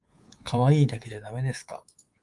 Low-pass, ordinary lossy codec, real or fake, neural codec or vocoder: 10.8 kHz; Opus, 24 kbps; fake; codec, 24 kHz, 3.1 kbps, DualCodec